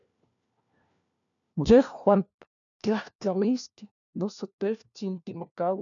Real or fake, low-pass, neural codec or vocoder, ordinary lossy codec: fake; 7.2 kHz; codec, 16 kHz, 1 kbps, FunCodec, trained on LibriTTS, 50 frames a second; MP3, 96 kbps